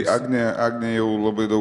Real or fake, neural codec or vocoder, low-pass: real; none; 10.8 kHz